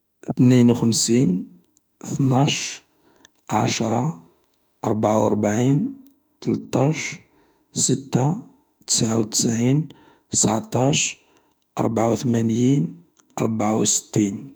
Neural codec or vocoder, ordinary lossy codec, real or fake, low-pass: autoencoder, 48 kHz, 32 numbers a frame, DAC-VAE, trained on Japanese speech; none; fake; none